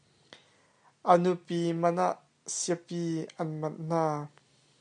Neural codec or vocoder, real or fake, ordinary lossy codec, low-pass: none; real; MP3, 96 kbps; 9.9 kHz